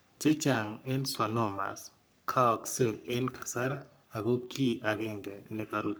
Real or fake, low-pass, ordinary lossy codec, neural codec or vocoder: fake; none; none; codec, 44.1 kHz, 3.4 kbps, Pupu-Codec